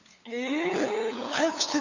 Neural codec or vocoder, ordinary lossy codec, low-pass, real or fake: codec, 16 kHz, 8 kbps, FunCodec, trained on LibriTTS, 25 frames a second; Opus, 64 kbps; 7.2 kHz; fake